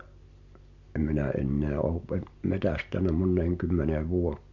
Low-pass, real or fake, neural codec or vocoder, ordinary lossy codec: 7.2 kHz; real; none; MP3, 48 kbps